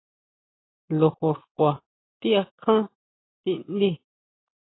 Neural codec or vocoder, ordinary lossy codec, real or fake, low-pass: none; AAC, 16 kbps; real; 7.2 kHz